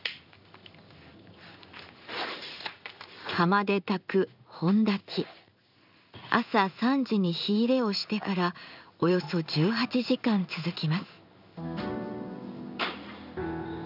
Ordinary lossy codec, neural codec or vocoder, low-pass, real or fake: none; codec, 16 kHz in and 24 kHz out, 1 kbps, XY-Tokenizer; 5.4 kHz; fake